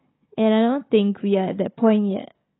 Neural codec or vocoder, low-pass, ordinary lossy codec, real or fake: codec, 16 kHz, 16 kbps, FunCodec, trained on Chinese and English, 50 frames a second; 7.2 kHz; AAC, 16 kbps; fake